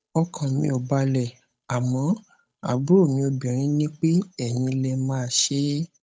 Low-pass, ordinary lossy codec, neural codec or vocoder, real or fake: none; none; codec, 16 kHz, 8 kbps, FunCodec, trained on Chinese and English, 25 frames a second; fake